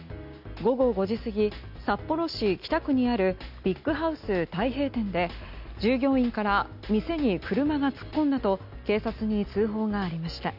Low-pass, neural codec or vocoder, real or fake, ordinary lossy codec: 5.4 kHz; none; real; none